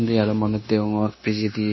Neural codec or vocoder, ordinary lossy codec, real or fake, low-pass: codec, 16 kHz, 0.9 kbps, LongCat-Audio-Codec; MP3, 24 kbps; fake; 7.2 kHz